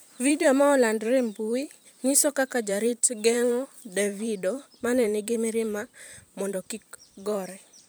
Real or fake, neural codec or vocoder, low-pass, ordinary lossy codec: fake; vocoder, 44.1 kHz, 128 mel bands every 512 samples, BigVGAN v2; none; none